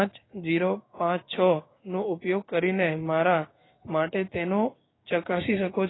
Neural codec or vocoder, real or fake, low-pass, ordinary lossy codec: codec, 16 kHz, 6 kbps, DAC; fake; 7.2 kHz; AAC, 16 kbps